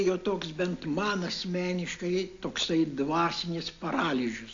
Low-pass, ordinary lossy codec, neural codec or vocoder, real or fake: 7.2 kHz; AAC, 48 kbps; none; real